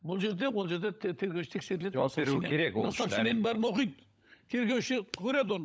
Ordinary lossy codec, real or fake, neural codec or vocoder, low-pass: none; fake; codec, 16 kHz, 16 kbps, FunCodec, trained on LibriTTS, 50 frames a second; none